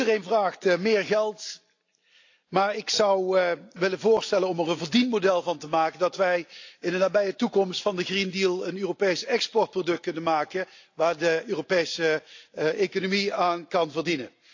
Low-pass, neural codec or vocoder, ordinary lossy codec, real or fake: 7.2 kHz; none; AAC, 48 kbps; real